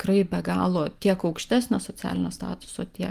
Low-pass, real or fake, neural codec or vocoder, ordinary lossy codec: 14.4 kHz; real; none; Opus, 32 kbps